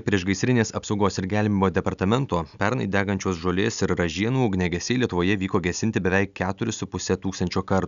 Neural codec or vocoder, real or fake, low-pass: none; real; 7.2 kHz